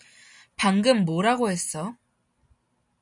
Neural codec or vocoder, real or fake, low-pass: none; real; 10.8 kHz